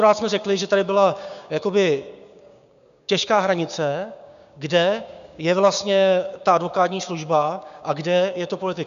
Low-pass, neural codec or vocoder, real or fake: 7.2 kHz; codec, 16 kHz, 6 kbps, DAC; fake